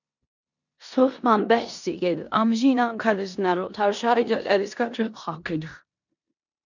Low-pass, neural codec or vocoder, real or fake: 7.2 kHz; codec, 16 kHz in and 24 kHz out, 0.9 kbps, LongCat-Audio-Codec, four codebook decoder; fake